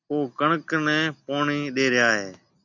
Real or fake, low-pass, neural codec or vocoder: real; 7.2 kHz; none